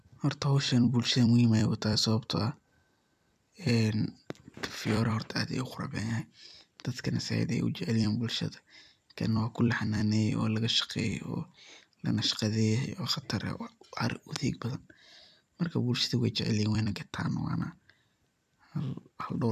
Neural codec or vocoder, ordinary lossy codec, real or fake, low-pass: vocoder, 44.1 kHz, 128 mel bands every 256 samples, BigVGAN v2; none; fake; 14.4 kHz